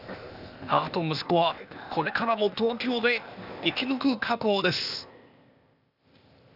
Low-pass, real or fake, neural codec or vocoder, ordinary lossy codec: 5.4 kHz; fake; codec, 16 kHz, 0.8 kbps, ZipCodec; none